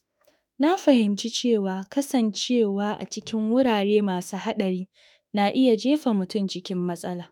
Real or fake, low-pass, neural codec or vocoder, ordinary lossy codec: fake; 19.8 kHz; autoencoder, 48 kHz, 32 numbers a frame, DAC-VAE, trained on Japanese speech; none